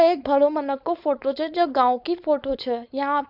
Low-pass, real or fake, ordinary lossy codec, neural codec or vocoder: 5.4 kHz; fake; none; codec, 16 kHz, 4.8 kbps, FACodec